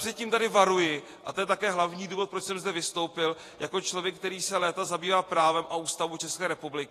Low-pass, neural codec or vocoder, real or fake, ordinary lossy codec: 14.4 kHz; vocoder, 48 kHz, 128 mel bands, Vocos; fake; AAC, 48 kbps